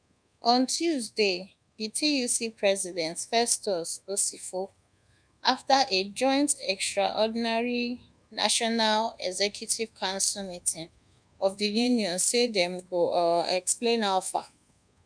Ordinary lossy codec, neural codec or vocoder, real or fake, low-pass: none; codec, 24 kHz, 1.2 kbps, DualCodec; fake; 9.9 kHz